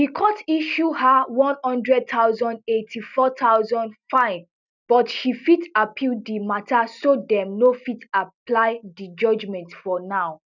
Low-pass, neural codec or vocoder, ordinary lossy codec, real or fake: 7.2 kHz; none; none; real